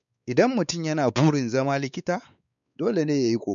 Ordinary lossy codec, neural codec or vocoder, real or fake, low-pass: none; codec, 16 kHz, 4 kbps, X-Codec, WavLM features, trained on Multilingual LibriSpeech; fake; 7.2 kHz